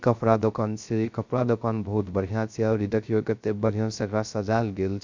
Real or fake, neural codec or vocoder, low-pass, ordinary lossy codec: fake; codec, 16 kHz, 0.3 kbps, FocalCodec; 7.2 kHz; MP3, 64 kbps